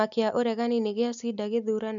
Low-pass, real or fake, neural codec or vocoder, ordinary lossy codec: 7.2 kHz; real; none; none